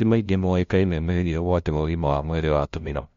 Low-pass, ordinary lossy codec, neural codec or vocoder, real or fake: 7.2 kHz; MP3, 48 kbps; codec, 16 kHz, 0.5 kbps, FunCodec, trained on LibriTTS, 25 frames a second; fake